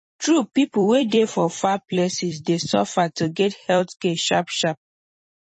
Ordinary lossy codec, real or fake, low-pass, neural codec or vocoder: MP3, 32 kbps; fake; 10.8 kHz; vocoder, 44.1 kHz, 128 mel bands every 256 samples, BigVGAN v2